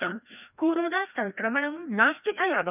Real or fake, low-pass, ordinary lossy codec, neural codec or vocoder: fake; 3.6 kHz; none; codec, 16 kHz, 1 kbps, FreqCodec, larger model